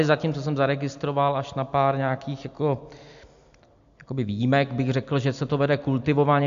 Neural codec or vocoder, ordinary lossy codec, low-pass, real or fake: none; MP3, 64 kbps; 7.2 kHz; real